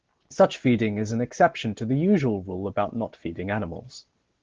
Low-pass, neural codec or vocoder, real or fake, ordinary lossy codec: 7.2 kHz; none; real; Opus, 16 kbps